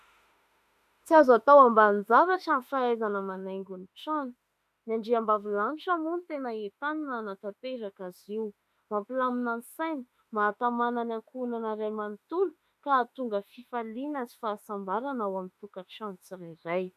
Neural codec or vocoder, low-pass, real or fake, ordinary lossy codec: autoencoder, 48 kHz, 32 numbers a frame, DAC-VAE, trained on Japanese speech; 14.4 kHz; fake; MP3, 96 kbps